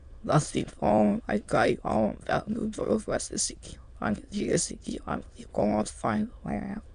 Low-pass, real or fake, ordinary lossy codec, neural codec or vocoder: 9.9 kHz; fake; AAC, 96 kbps; autoencoder, 22.05 kHz, a latent of 192 numbers a frame, VITS, trained on many speakers